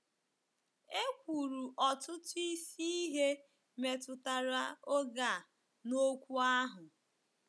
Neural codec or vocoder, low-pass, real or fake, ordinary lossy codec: none; none; real; none